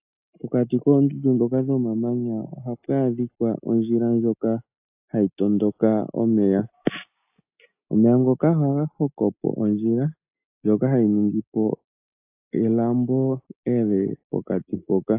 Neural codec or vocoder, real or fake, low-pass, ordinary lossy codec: none; real; 3.6 kHz; AAC, 32 kbps